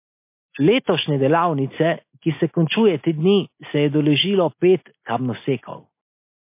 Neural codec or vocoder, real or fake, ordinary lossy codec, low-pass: none; real; MP3, 24 kbps; 3.6 kHz